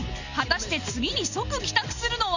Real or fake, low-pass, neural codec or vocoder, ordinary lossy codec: real; 7.2 kHz; none; none